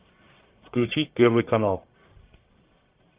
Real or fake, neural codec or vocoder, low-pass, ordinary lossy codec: fake; codec, 44.1 kHz, 1.7 kbps, Pupu-Codec; 3.6 kHz; Opus, 24 kbps